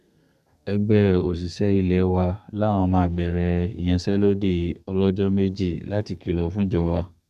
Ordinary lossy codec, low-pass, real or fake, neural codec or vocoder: none; 14.4 kHz; fake; codec, 32 kHz, 1.9 kbps, SNAC